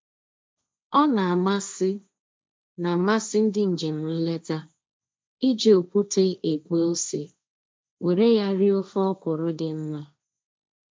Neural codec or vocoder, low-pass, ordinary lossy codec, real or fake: codec, 16 kHz, 1.1 kbps, Voila-Tokenizer; none; none; fake